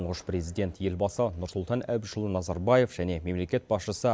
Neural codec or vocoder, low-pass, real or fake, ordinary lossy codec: none; none; real; none